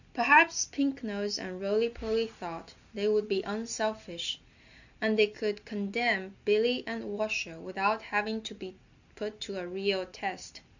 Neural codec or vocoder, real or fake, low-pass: none; real; 7.2 kHz